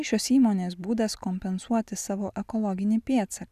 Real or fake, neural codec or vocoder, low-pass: real; none; 14.4 kHz